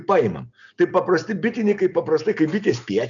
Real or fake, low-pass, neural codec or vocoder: real; 7.2 kHz; none